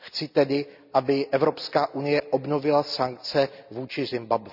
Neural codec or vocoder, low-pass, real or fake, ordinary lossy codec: none; 5.4 kHz; real; none